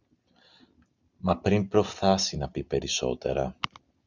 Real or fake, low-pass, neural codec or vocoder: real; 7.2 kHz; none